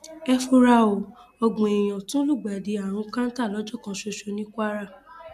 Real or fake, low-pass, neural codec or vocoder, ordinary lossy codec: real; 14.4 kHz; none; none